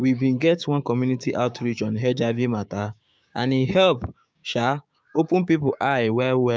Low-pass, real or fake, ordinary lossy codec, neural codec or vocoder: none; fake; none; codec, 16 kHz, 6 kbps, DAC